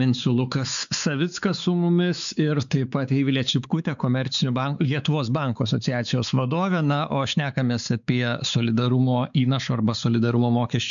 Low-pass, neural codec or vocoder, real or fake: 7.2 kHz; codec, 16 kHz, 4 kbps, X-Codec, WavLM features, trained on Multilingual LibriSpeech; fake